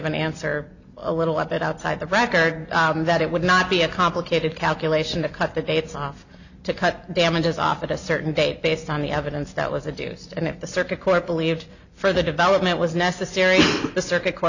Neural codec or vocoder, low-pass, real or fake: none; 7.2 kHz; real